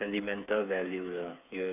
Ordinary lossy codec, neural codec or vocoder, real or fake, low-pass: none; codec, 16 kHz, 8 kbps, FreqCodec, smaller model; fake; 3.6 kHz